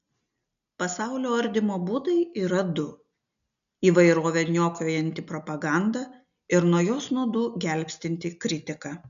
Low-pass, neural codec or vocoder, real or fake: 7.2 kHz; none; real